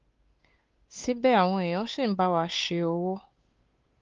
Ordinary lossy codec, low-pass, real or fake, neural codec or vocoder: Opus, 32 kbps; 7.2 kHz; fake; codec, 16 kHz, 8 kbps, FunCodec, trained on Chinese and English, 25 frames a second